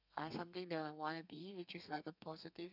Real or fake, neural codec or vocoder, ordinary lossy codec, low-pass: fake; codec, 32 kHz, 1.9 kbps, SNAC; none; 5.4 kHz